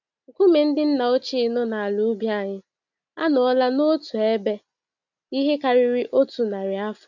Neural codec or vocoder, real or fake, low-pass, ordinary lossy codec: none; real; 7.2 kHz; none